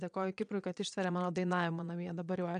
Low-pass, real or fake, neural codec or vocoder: 9.9 kHz; fake; vocoder, 22.05 kHz, 80 mel bands, Vocos